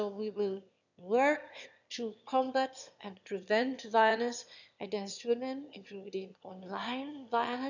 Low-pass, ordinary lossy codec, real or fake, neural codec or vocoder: 7.2 kHz; none; fake; autoencoder, 22.05 kHz, a latent of 192 numbers a frame, VITS, trained on one speaker